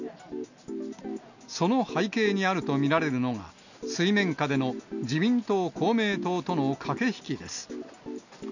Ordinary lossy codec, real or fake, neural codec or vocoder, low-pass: none; real; none; 7.2 kHz